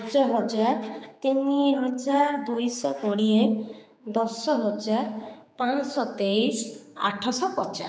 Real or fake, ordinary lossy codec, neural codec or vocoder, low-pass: fake; none; codec, 16 kHz, 4 kbps, X-Codec, HuBERT features, trained on general audio; none